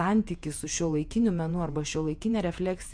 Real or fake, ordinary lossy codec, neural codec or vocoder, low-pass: fake; MP3, 64 kbps; vocoder, 48 kHz, 128 mel bands, Vocos; 9.9 kHz